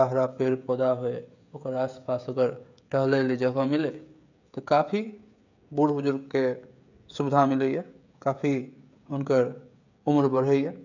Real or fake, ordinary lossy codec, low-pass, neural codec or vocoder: fake; none; 7.2 kHz; codec, 16 kHz, 16 kbps, FreqCodec, smaller model